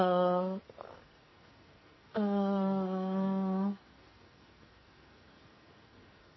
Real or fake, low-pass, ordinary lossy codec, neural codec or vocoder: fake; 7.2 kHz; MP3, 24 kbps; codec, 44.1 kHz, 2.6 kbps, SNAC